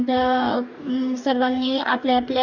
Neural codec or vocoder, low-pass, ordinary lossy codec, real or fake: codec, 44.1 kHz, 2.6 kbps, DAC; 7.2 kHz; none; fake